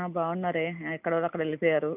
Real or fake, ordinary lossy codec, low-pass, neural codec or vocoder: real; none; 3.6 kHz; none